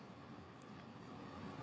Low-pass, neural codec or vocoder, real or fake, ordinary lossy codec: none; codec, 16 kHz, 8 kbps, FreqCodec, larger model; fake; none